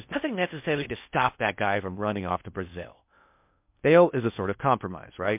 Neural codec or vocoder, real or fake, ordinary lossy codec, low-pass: codec, 16 kHz in and 24 kHz out, 0.6 kbps, FocalCodec, streaming, 4096 codes; fake; MP3, 32 kbps; 3.6 kHz